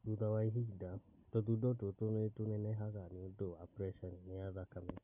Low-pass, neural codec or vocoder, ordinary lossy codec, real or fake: 3.6 kHz; none; none; real